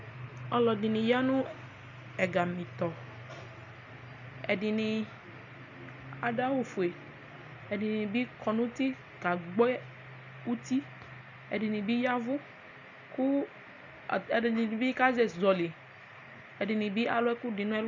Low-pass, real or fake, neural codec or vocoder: 7.2 kHz; real; none